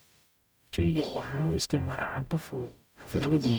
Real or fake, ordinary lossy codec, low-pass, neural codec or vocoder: fake; none; none; codec, 44.1 kHz, 0.9 kbps, DAC